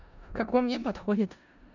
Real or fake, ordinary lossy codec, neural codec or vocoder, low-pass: fake; none; codec, 16 kHz in and 24 kHz out, 0.4 kbps, LongCat-Audio-Codec, four codebook decoder; 7.2 kHz